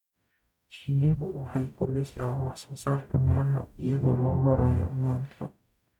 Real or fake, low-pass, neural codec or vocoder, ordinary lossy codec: fake; 19.8 kHz; codec, 44.1 kHz, 0.9 kbps, DAC; none